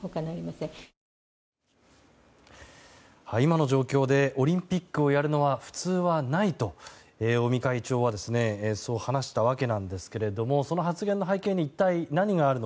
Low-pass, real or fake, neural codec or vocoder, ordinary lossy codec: none; real; none; none